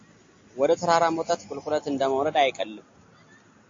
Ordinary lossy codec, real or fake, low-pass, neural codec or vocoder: AAC, 48 kbps; real; 7.2 kHz; none